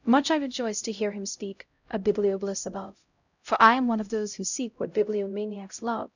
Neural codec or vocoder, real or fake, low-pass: codec, 16 kHz, 0.5 kbps, X-Codec, HuBERT features, trained on LibriSpeech; fake; 7.2 kHz